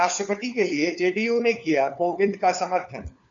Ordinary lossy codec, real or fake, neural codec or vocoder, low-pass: MP3, 96 kbps; fake; codec, 16 kHz, 4 kbps, FunCodec, trained on LibriTTS, 50 frames a second; 7.2 kHz